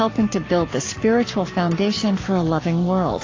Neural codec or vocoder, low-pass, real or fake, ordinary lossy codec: codec, 44.1 kHz, 7.8 kbps, Pupu-Codec; 7.2 kHz; fake; AAC, 32 kbps